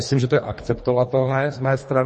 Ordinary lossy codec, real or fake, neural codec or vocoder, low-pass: MP3, 32 kbps; fake; codec, 24 kHz, 3 kbps, HILCodec; 10.8 kHz